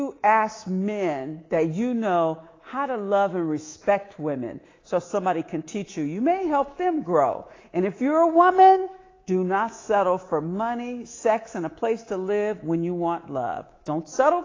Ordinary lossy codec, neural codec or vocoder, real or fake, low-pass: AAC, 32 kbps; codec, 24 kHz, 3.1 kbps, DualCodec; fake; 7.2 kHz